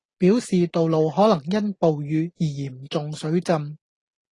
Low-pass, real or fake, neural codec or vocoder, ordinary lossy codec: 10.8 kHz; real; none; AAC, 32 kbps